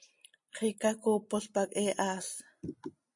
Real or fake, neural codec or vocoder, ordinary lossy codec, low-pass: real; none; MP3, 48 kbps; 10.8 kHz